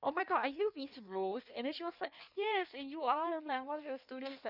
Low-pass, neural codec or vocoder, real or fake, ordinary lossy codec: 5.4 kHz; codec, 16 kHz in and 24 kHz out, 1.1 kbps, FireRedTTS-2 codec; fake; none